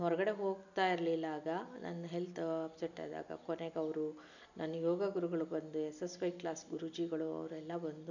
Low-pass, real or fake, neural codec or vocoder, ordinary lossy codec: 7.2 kHz; real; none; none